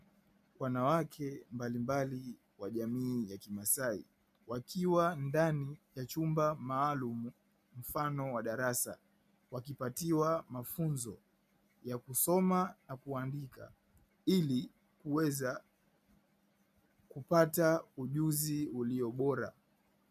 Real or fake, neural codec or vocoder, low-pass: real; none; 14.4 kHz